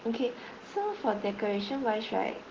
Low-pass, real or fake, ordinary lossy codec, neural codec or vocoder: 7.2 kHz; real; Opus, 16 kbps; none